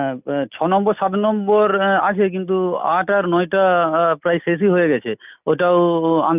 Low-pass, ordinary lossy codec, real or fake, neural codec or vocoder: 3.6 kHz; none; real; none